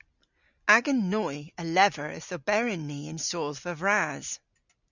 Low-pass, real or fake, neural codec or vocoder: 7.2 kHz; real; none